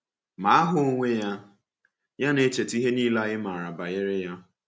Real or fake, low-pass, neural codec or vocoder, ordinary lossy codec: real; none; none; none